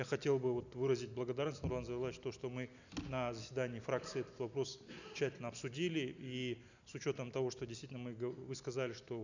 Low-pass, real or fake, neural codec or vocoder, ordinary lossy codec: 7.2 kHz; real; none; none